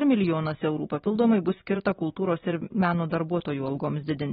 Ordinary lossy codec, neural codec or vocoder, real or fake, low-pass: AAC, 16 kbps; none; real; 19.8 kHz